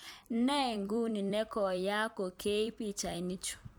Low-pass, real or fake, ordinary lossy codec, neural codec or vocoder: none; fake; none; vocoder, 44.1 kHz, 128 mel bands every 256 samples, BigVGAN v2